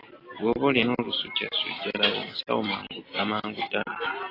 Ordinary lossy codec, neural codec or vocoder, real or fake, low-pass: AAC, 24 kbps; none; real; 5.4 kHz